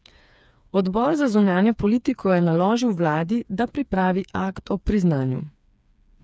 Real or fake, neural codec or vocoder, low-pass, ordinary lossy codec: fake; codec, 16 kHz, 4 kbps, FreqCodec, smaller model; none; none